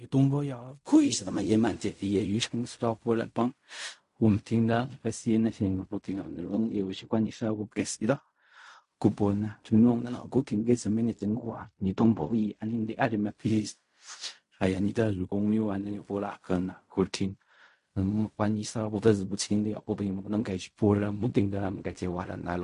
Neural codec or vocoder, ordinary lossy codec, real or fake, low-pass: codec, 16 kHz in and 24 kHz out, 0.4 kbps, LongCat-Audio-Codec, fine tuned four codebook decoder; MP3, 48 kbps; fake; 10.8 kHz